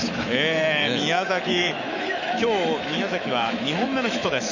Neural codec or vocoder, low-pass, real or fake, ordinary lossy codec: autoencoder, 48 kHz, 128 numbers a frame, DAC-VAE, trained on Japanese speech; 7.2 kHz; fake; none